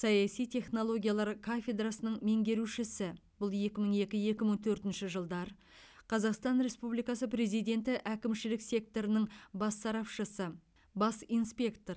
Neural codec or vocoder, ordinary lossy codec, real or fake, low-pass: none; none; real; none